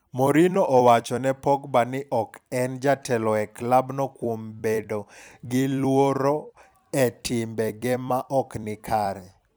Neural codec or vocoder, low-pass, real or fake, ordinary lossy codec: vocoder, 44.1 kHz, 128 mel bands every 256 samples, BigVGAN v2; none; fake; none